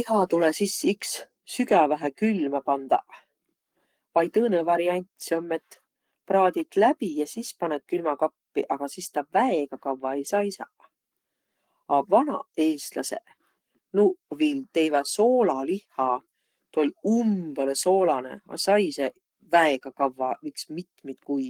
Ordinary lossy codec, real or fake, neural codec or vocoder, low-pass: Opus, 24 kbps; fake; codec, 44.1 kHz, 7.8 kbps, Pupu-Codec; 19.8 kHz